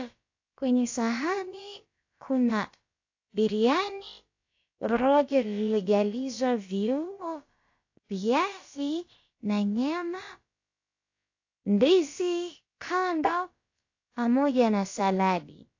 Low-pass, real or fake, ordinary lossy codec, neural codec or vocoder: 7.2 kHz; fake; AAC, 48 kbps; codec, 16 kHz, about 1 kbps, DyCAST, with the encoder's durations